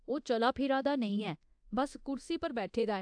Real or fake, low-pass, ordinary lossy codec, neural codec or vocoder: fake; 9.9 kHz; AAC, 64 kbps; codec, 24 kHz, 0.9 kbps, DualCodec